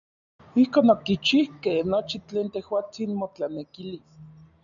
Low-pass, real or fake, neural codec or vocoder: 7.2 kHz; real; none